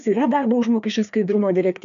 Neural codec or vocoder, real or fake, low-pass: codec, 16 kHz, 2 kbps, FreqCodec, larger model; fake; 7.2 kHz